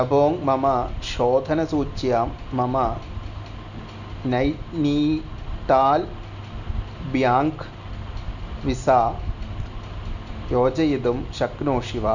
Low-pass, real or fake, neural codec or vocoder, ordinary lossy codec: 7.2 kHz; real; none; none